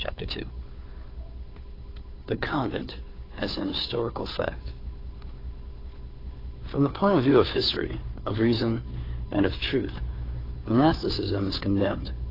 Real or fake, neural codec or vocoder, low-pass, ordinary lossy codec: fake; codec, 16 kHz, 2 kbps, FunCodec, trained on Chinese and English, 25 frames a second; 5.4 kHz; AAC, 24 kbps